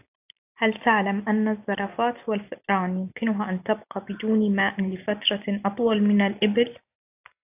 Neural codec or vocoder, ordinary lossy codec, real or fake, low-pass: none; AAC, 32 kbps; real; 3.6 kHz